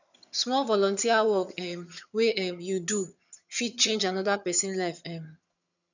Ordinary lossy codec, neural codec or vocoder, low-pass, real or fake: none; vocoder, 22.05 kHz, 80 mel bands, HiFi-GAN; 7.2 kHz; fake